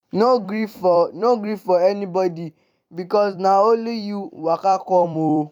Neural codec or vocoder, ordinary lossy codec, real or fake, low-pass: vocoder, 44.1 kHz, 128 mel bands every 256 samples, BigVGAN v2; none; fake; 19.8 kHz